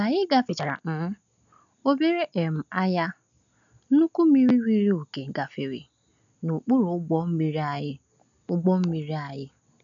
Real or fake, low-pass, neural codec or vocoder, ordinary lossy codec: real; 7.2 kHz; none; none